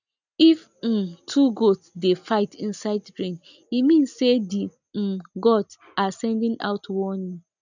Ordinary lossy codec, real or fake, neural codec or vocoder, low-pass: none; real; none; 7.2 kHz